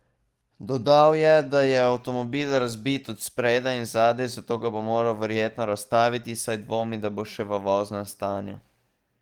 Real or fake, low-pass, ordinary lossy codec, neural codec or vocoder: fake; 19.8 kHz; Opus, 24 kbps; codec, 44.1 kHz, 7.8 kbps, DAC